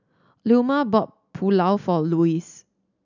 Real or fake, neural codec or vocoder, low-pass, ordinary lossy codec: real; none; 7.2 kHz; none